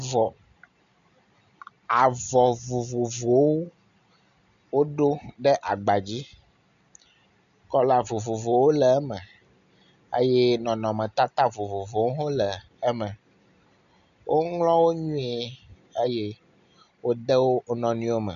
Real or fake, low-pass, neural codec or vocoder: real; 7.2 kHz; none